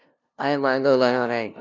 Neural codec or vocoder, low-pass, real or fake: codec, 16 kHz, 0.5 kbps, FunCodec, trained on LibriTTS, 25 frames a second; 7.2 kHz; fake